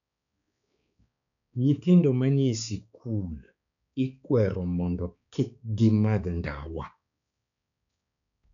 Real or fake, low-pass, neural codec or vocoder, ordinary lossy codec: fake; 7.2 kHz; codec, 16 kHz, 4 kbps, X-Codec, HuBERT features, trained on balanced general audio; none